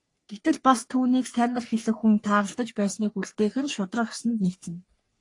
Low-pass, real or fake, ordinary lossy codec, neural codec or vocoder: 10.8 kHz; fake; AAC, 48 kbps; codec, 44.1 kHz, 3.4 kbps, Pupu-Codec